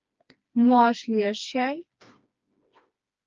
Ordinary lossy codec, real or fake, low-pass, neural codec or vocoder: Opus, 24 kbps; fake; 7.2 kHz; codec, 16 kHz, 2 kbps, FreqCodec, smaller model